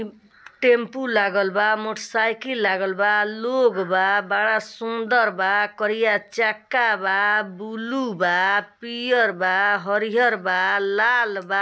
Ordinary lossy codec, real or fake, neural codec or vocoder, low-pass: none; real; none; none